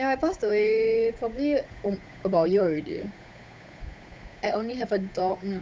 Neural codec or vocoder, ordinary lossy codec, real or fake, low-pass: codec, 16 kHz, 8 kbps, FunCodec, trained on Chinese and English, 25 frames a second; none; fake; none